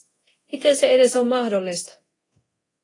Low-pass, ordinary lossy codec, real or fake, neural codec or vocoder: 10.8 kHz; AAC, 32 kbps; fake; codec, 24 kHz, 0.9 kbps, DualCodec